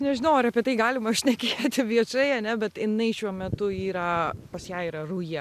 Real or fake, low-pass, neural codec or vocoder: real; 14.4 kHz; none